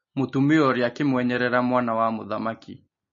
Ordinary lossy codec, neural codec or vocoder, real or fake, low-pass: MP3, 32 kbps; none; real; 7.2 kHz